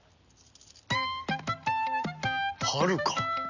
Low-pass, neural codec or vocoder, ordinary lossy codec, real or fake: 7.2 kHz; none; none; real